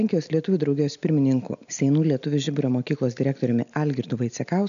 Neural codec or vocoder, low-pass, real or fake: none; 7.2 kHz; real